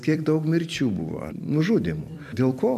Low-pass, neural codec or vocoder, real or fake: 14.4 kHz; vocoder, 44.1 kHz, 128 mel bands every 256 samples, BigVGAN v2; fake